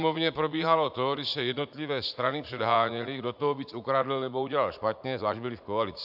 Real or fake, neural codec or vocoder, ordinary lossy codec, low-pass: fake; vocoder, 44.1 kHz, 80 mel bands, Vocos; AAC, 48 kbps; 5.4 kHz